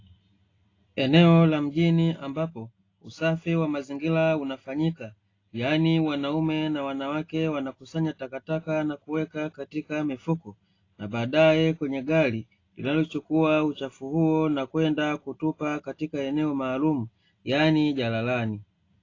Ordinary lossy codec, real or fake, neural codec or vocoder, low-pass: AAC, 32 kbps; real; none; 7.2 kHz